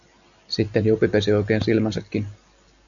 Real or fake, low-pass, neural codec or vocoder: real; 7.2 kHz; none